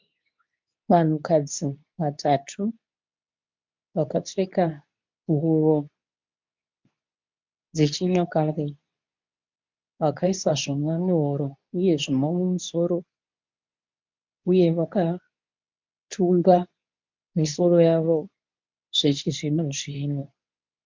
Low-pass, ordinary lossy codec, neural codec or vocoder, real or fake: 7.2 kHz; MP3, 64 kbps; codec, 24 kHz, 0.9 kbps, WavTokenizer, medium speech release version 2; fake